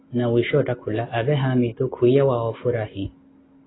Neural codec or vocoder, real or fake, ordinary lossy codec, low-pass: none; real; AAC, 16 kbps; 7.2 kHz